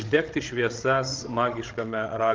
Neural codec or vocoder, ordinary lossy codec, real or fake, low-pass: codec, 16 kHz, 16 kbps, FreqCodec, larger model; Opus, 16 kbps; fake; 7.2 kHz